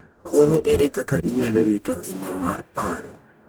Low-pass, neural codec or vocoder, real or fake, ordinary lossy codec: none; codec, 44.1 kHz, 0.9 kbps, DAC; fake; none